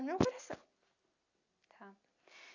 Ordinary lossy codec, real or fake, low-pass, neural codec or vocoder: none; real; 7.2 kHz; none